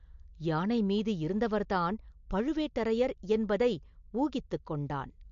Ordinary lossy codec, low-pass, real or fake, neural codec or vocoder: MP3, 48 kbps; 7.2 kHz; real; none